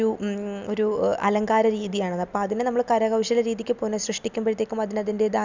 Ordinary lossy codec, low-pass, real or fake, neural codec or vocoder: Opus, 64 kbps; 7.2 kHz; real; none